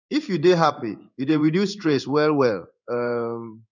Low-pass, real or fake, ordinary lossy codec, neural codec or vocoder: 7.2 kHz; fake; none; codec, 16 kHz in and 24 kHz out, 1 kbps, XY-Tokenizer